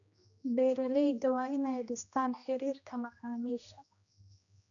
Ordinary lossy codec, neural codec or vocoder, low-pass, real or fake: none; codec, 16 kHz, 1 kbps, X-Codec, HuBERT features, trained on general audio; 7.2 kHz; fake